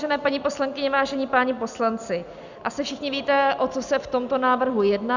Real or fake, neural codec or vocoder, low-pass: real; none; 7.2 kHz